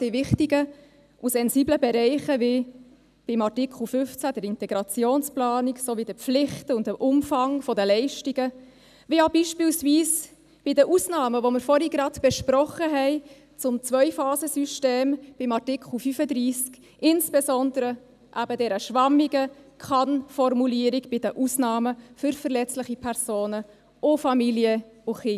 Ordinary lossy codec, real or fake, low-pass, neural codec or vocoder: none; real; 14.4 kHz; none